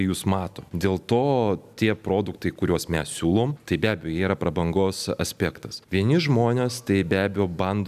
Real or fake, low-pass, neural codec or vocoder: real; 14.4 kHz; none